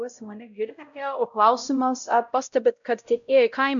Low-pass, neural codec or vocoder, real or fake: 7.2 kHz; codec, 16 kHz, 0.5 kbps, X-Codec, WavLM features, trained on Multilingual LibriSpeech; fake